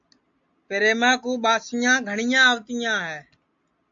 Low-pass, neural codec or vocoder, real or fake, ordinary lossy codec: 7.2 kHz; none; real; AAC, 64 kbps